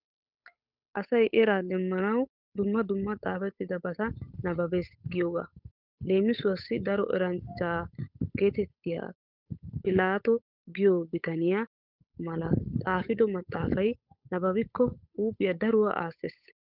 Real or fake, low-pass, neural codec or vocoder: fake; 5.4 kHz; codec, 16 kHz, 8 kbps, FunCodec, trained on Chinese and English, 25 frames a second